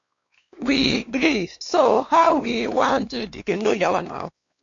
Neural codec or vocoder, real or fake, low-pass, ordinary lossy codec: codec, 16 kHz, 2 kbps, X-Codec, WavLM features, trained on Multilingual LibriSpeech; fake; 7.2 kHz; MP3, 48 kbps